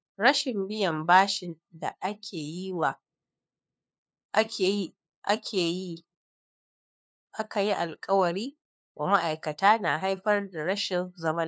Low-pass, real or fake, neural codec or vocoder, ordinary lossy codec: none; fake; codec, 16 kHz, 2 kbps, FunCodec, trained on LibriTTS, 25 frames a second; none